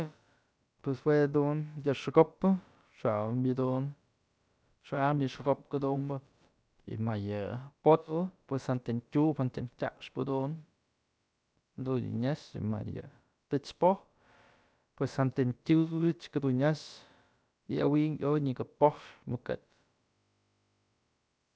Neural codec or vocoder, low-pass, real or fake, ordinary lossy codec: codec, 16 kHz, about 1 kbps, DyCAST, with the encoder's durations; none; fake; none